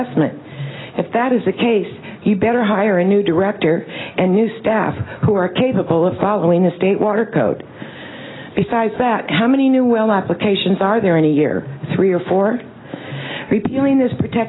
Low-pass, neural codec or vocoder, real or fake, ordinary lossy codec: 7.2 kHz; none; real; AAC, 16 kbps